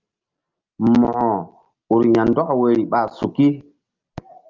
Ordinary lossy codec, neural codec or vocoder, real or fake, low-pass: Opus, 24 kbps; none; real; 7.2 kHz